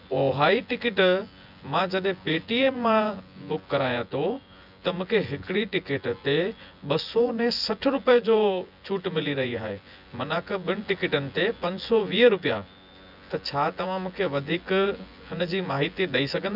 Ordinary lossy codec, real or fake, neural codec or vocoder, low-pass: none; fake; vocoder, 24 kHz, 100 mel bands, Vocos; 5.4 kHz